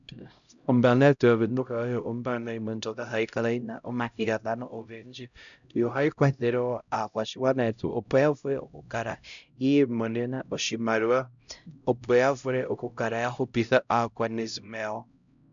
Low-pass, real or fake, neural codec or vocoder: 7.2 kHz; fake; codec, 16 kHz, 0.5 kbps, X-Codec, HuBERT features, trained on LibriSpeech